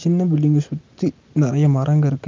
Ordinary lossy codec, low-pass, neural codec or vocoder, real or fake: Opus, 24 kbps; 7.2 kHz; none; real